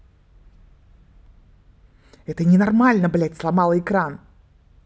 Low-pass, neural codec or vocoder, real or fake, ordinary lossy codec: none; none; real; none